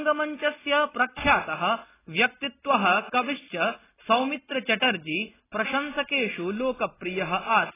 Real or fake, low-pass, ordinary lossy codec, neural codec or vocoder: real; 3.6 kHz; AAC, 16 kbps; none